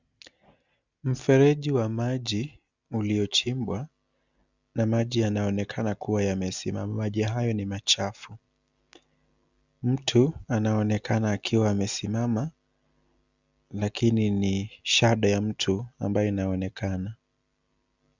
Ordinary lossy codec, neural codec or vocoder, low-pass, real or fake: Opus, 64 kbps; none; 7.2 kHz; real